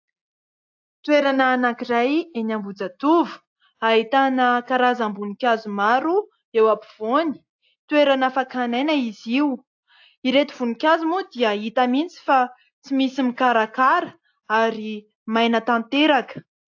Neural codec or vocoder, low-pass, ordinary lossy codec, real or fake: none; 7.2 kHz; AAC, 48 kbps; real